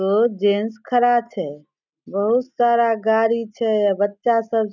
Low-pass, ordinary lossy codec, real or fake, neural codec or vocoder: 7.2 kHz; none; real; none